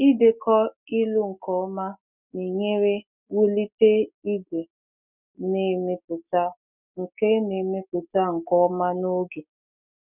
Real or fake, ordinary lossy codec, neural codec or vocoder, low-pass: real; none; none; 3.6 kHz